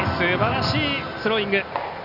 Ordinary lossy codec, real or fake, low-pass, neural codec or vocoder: none; real; 5.4 kHz; none